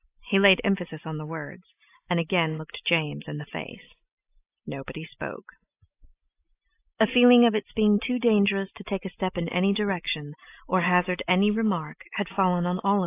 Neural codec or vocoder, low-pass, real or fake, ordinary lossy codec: none; 3.6 kHz; real; AAC, 24 kbps